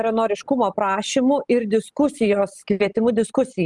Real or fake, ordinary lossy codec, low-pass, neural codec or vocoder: real; Opus, 32 kbps; 10.8 kHz; none